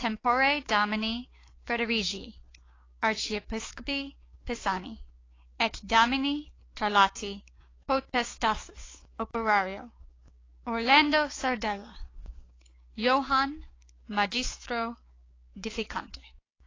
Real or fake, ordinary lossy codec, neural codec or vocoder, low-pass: fake; AAC, 32 kbps; codec, 16 kHz, 6 kbps, DAC; 7.2 kHz